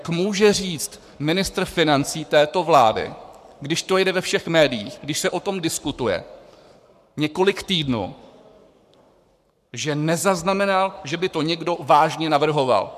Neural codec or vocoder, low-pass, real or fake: codec, 44.1 kHz, 7.8 kbps, Pupu-Codec; 14.4 kHz; fake